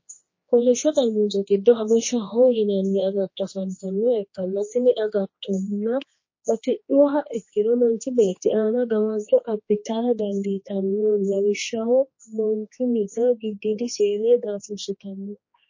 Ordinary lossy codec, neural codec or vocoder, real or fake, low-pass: MP3, 32 kbps; codec, 16 kHz, 2 kbps, X-Codec, HuBERT features, trained on general audio; fake; 7.2 kHz